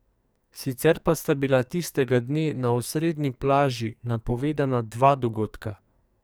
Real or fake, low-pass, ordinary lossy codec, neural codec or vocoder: fake; none; none; codec, 44.1 kHz, 2.6 kbps, SNAC